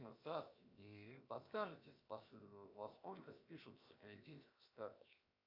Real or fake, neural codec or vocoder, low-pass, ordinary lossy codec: fake; codec, 16 kHz, 0.7 kbps, FocalCodec; 5.4 kHz; Opus, 64 kbps